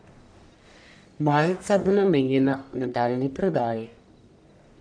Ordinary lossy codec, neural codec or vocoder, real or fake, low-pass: none; codec, 44.1 kHz, 1.7 kbps, Pupu-Codec; fake; 9.9 kHz